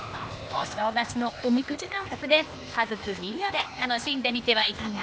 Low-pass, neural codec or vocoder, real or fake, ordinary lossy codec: none; codec, 16 kHz, 0.8 kbps, ZipCodec; fake; none